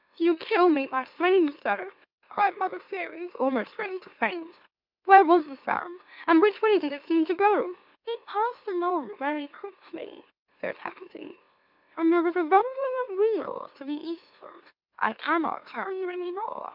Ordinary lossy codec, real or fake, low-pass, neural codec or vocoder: MP3, 48 kbps; fake; 5.4 kHz; autoencoder, 44.1 kHz, a latent of 192 numbers a frame, MeloTTS